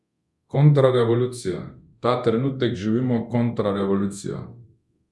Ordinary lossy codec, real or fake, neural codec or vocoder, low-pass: none; fake; codec, 24 kHz, 0.9 kbps, DualCodec; none